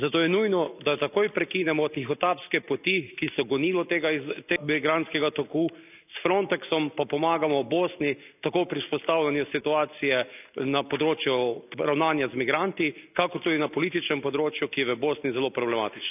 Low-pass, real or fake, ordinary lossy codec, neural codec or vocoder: 3.6 kHz; real; none; none